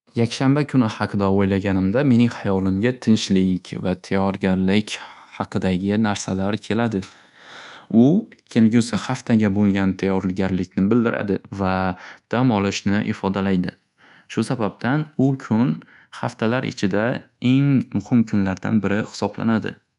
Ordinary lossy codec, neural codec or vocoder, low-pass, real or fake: none; codec, 24 kHz, 1.2 kbps, DualCodec; 10.8 kHz; fake